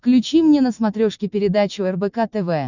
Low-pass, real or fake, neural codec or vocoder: 7.2 kHz; real; none